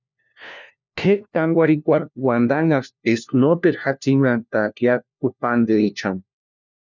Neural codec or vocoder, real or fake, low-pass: codec, 16 kHz, 1 kbps, FunCodec, trained on LibriTTS, 50 frames a second; fake; 7.2 kHz